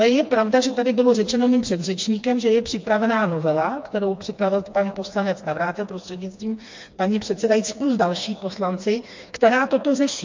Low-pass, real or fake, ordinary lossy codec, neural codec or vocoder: 7.2 kHz; fake; MP3, 48 kbps; codec, 16 kHz, 2 kbps, FreqCodec, smaller model